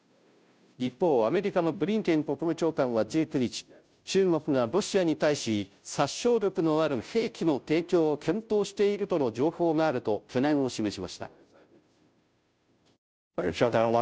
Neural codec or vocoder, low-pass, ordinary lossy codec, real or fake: codec, 16 kHz, 0.5 kbps, FunCodec, trained on Chinese and English, 25 frames a second; none; none; fake